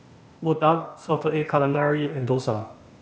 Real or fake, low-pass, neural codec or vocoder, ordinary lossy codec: fake; none; codec, 16 kHz, 0.8 kbps, ZipCodec; none